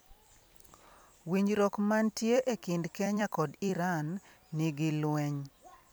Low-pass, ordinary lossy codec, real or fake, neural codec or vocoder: none; none; fake; vocoder, 44.1 kHz, 128 mel bands every 256 samples, BigVGAN v2